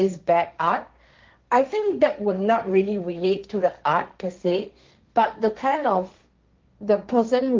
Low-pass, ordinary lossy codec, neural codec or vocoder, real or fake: 7.2 kHz; Opus, 32 kbps; codec, 16 kHz, 1.1 kbps, Voila-Tokenizer; fake